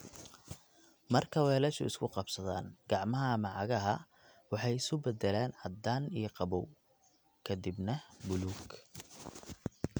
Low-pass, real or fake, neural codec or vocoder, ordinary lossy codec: none; real; none; none